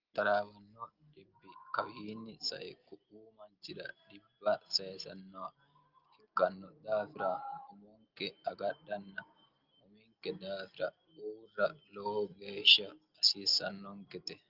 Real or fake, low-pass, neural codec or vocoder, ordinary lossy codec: real; 5.4 kHz; none; Opus, 32 kbps